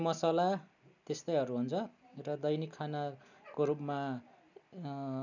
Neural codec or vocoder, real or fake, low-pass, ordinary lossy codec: none; real; 7.2 kHz; none